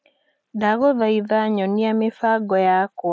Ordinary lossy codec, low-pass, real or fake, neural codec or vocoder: none; none; real; none